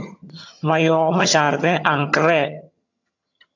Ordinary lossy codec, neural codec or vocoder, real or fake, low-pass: AAC, 48 kbps; vocoder, 22.05 kHz, 80 mel bands, HiFi-GAN; fake; 7.2 kHz